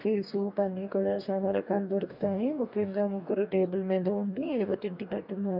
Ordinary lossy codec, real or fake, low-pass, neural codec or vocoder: none; fake; 5.4 kHz; codec, 44.1 kHz, 2.6 kbps, DAC